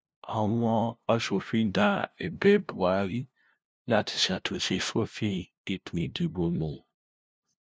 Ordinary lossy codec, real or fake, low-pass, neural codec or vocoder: none; fake; none; codec, 16 kHz, 0.5 kbps, FunCodec, trained on LibriTTS, 25 frames a second